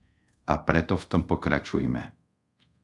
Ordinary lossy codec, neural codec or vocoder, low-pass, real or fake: AAC, 64 kbps; codec, 24 kHz, 0.5 kbps, DualCodec; 10.8 kHz; fake